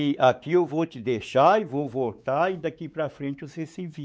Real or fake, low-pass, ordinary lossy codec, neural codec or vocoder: fake; none; none; codec, 16 kHz, 4 kbps, X-Codec, WavLM features, trained on Multilingual LibriSpeech